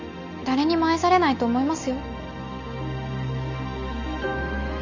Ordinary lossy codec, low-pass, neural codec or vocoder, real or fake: none; 7.2 kHz; none; real